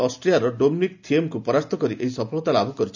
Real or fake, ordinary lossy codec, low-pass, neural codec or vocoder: real; none; 7.2 kHz; none